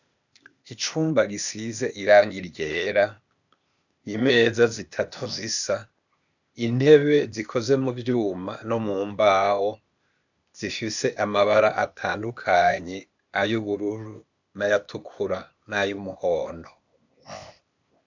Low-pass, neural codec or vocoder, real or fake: 7.2 kHz; codec, 16 kHz, 0.8 kbps, ZipCodec; fake